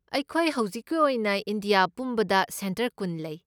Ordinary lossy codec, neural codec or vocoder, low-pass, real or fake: none; none; none; real